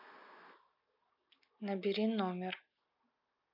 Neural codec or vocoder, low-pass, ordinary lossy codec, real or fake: none; 5.4 kHz; none; real